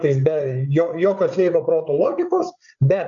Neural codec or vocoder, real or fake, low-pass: codec, 16 kHz, 8 kbps, FreqCodec, larger model; fake; 7.2 kHz